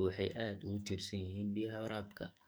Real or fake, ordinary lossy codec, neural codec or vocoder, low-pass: fake; none; codec, 44.1 kHz, 2.6 kbps, SNAC; none